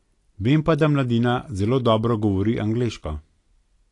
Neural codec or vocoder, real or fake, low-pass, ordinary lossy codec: none; real; 10.8 kHz; AAC, 48 kbps